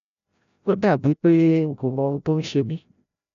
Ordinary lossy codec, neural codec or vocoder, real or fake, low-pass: none; codec, 16 kHz, 0.5 kbps, FreqCodec, larger model; fake; 7.2 kHz